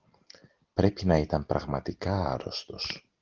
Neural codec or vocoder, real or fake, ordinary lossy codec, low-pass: none; real; Opus, 16 kbps; 7.2 kHz